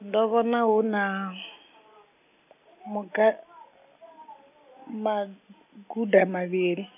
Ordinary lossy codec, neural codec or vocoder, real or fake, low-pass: none; none; real; 3.6 kHz